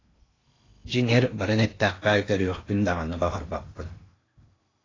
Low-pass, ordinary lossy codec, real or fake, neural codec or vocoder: 7.2 kHz; AAC, 32 kbps; fake; codec, 16 kHz in and 24 kHz out, 0.8 kbps, FocalCodec, streaming, 65536 codes